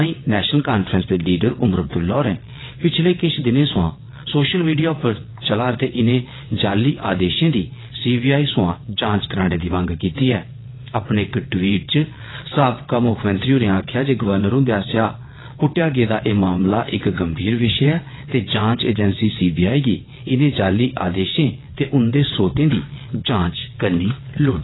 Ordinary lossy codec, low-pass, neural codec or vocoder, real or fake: AAC, 16 kbps; 7.2 kHz; vocoder, 22.05 kHz, 80 mel bands, WaveNeXt; fake